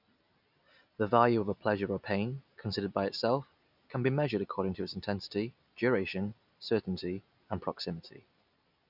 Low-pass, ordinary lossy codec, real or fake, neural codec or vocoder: 5.4 kHz; none; real; none